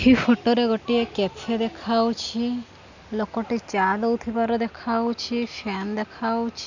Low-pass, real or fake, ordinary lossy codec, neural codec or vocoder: 7.2 kHz; real; none; none